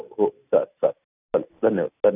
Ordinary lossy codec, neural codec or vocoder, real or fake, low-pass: none; none; real; 3.6 kHz